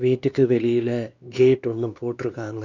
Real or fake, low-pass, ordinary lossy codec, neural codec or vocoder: fake; 7.2 kHz; Opus, 64 kbps; codec, 16 kHz, 2 kbps, X-Codec, WavLM features, trained on Multilingual LibriSpeech